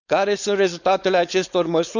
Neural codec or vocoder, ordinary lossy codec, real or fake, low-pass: codec, 16 kHz, 4.8 kbps, FACodec; none; fake; 7.2 kHz